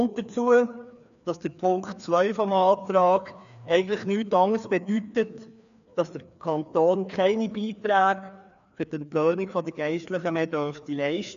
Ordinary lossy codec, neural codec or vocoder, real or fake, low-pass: MP3, 96 kbps; codec, 16 kHz, 2 kbps, FreqCodec, larger model; fake; 7.2 kHz